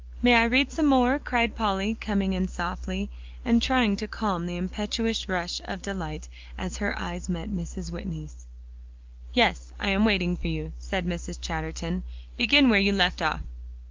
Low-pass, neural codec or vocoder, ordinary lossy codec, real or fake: 7.2 kHz; none; Opus, 32 kbps; real